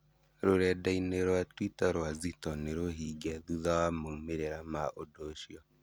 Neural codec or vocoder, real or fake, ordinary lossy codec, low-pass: none; real; none; none